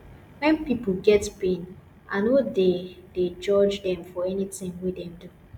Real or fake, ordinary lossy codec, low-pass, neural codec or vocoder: real; none; 19.8 kHz; none